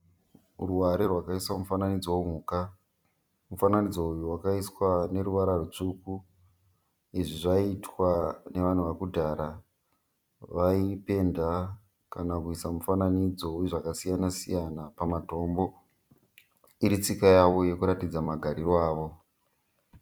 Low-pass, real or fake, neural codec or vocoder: 19.8 kHz; fake; vocoder, 44.1 kHz, 128 mel bands every 256 samples, BigVGAN v2